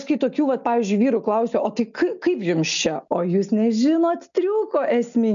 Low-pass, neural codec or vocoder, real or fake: 7.2 kHz; none; real